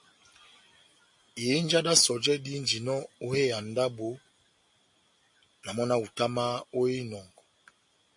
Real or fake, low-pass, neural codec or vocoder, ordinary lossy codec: real; 10.8 kHz; none; MP3, 48 kbps